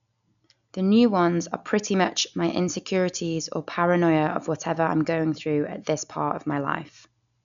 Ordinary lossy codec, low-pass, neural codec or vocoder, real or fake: none; 7.2 kHz; none; real